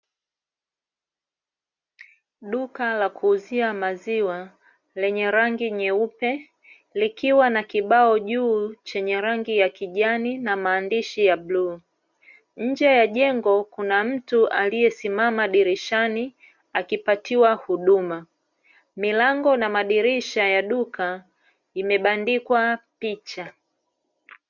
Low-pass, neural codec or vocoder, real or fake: 7.2 kHz; none; real